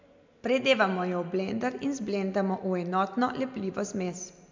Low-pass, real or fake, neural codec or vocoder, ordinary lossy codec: 7.2 kHz; real; none; MP3, 64 kbps